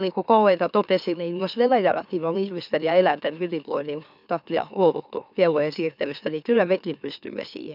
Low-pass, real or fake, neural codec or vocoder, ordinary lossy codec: 5.4 kHz; fake; autoencoder, 44.1 kHz, a latent of 192 numbers a frame, MeloTTS; none